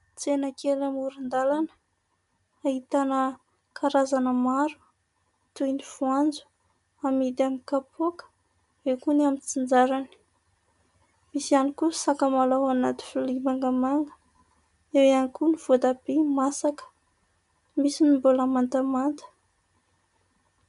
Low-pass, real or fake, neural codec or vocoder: 10.8 kHz; real; none